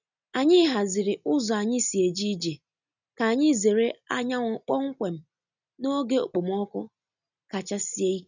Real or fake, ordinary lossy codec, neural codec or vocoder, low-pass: real; none; none; 7.2 kHz